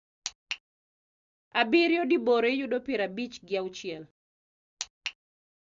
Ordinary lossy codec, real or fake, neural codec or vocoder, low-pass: Opus, 64 kbps; real; none; 7.2 kHz